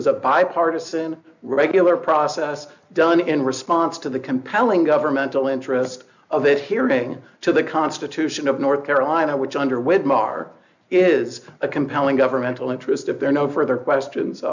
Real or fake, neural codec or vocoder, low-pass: real; none; 7.2 kHz